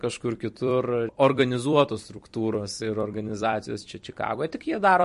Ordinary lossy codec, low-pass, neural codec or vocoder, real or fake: MP3, 48 kbps; 14.4 kHz; vocoder, 44.1 kHz, 128 mel bands every 256 samples, BigVGAN v2; fake